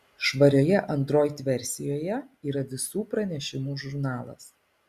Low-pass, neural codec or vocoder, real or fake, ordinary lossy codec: 14.4 kHz; none; real; Opus, 64 kbps